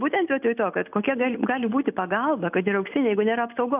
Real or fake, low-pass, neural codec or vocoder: real; 3.6 kHz; none